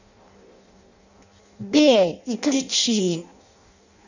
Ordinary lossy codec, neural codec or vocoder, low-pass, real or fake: none; codec, 16 kHz in and 24 kHz out, 0.6 kbps, FireRedTTS-2 codec; 7.2 kHz; fake